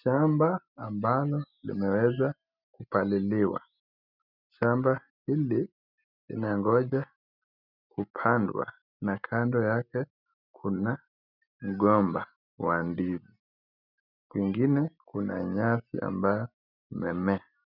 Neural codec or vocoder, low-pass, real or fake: none; 5.4 kHz; real